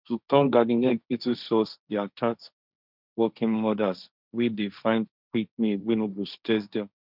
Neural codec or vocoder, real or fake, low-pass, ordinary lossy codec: codec, 16 kHz, 1.1 kbps, Voila-Tokenizer; fake; 5.4 kHz; none